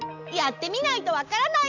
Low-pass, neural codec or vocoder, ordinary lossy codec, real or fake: 7.2 kHz; none; none; real